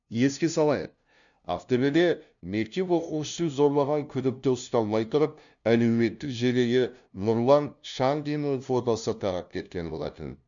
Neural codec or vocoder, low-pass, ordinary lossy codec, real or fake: codec, 16 kHz, 0.5 kbps, FunCodec, trained on LibriTTS, 25 frames a second; 7.2 kHz; none; fake